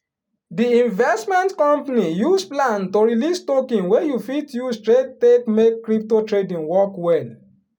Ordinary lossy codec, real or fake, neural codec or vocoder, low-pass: none; real; none; 19.8 kHz